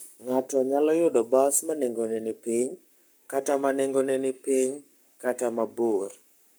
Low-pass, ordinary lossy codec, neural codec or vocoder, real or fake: none; none; codec, 44.1 kHz, 7.8 kbps, Pupu-Codec; fake